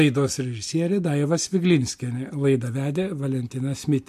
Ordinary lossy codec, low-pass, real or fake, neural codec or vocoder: MP3, 64 kbps; 14.4 kHz; real; none